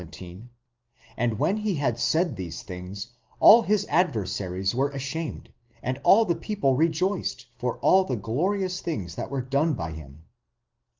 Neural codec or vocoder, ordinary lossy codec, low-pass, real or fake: none; Opus, 32 kbps; 7.2 kHz; real